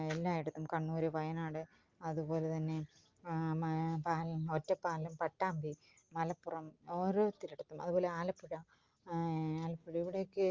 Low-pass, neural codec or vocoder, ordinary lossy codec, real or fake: 7.2 kHz; none; Opus, 32 kbps; real